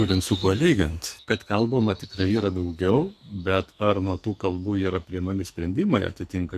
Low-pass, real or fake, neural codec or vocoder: 14.4 kHz; fake; codec, 44.1 kHz, 2.6 kbps, SNAC